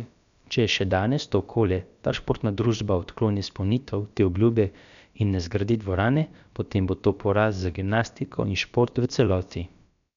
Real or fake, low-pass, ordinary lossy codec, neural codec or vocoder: fake; 7.2 kHz; MP3, 96 kbps; codec, 16 kHz, about 1 kbps, DyCAST, with the encoder's durations